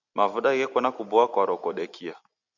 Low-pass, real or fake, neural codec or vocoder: 7.2 kHz; real; none